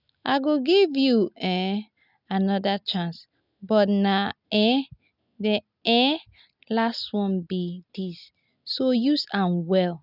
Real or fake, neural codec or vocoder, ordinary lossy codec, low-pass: real; none; none; 5.4 kHz